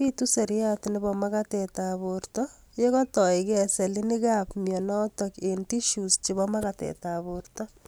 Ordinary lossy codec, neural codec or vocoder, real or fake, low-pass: none; none; real; none